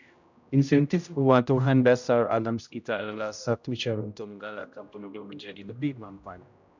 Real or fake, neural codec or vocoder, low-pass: fake; codec, 16 kHz, 0.5 kbps, X-Codec, HuBERT features, trained on general audio; 7.2 kHz